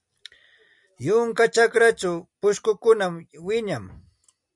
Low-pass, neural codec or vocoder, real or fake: 10.8 kHz; none; real